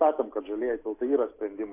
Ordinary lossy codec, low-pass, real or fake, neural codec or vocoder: AAC, 24 kbps; 3.6 kHz; real; none